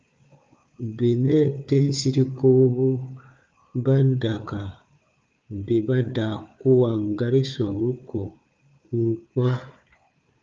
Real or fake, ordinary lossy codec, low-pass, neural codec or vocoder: fake; Opus, 24 kbps; 7.2 kHz; codec, 16 kHz, 4 kbps, FunCodec, trained on Chinese and English, 50 frames a second